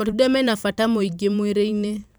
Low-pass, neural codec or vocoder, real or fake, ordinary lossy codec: none; vocoder, 44.1 kHz, 128 mel bands every 512 samples, BigVGAN v2; fake; none